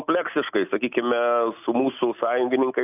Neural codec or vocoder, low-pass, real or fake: none; 3.6 kHz; real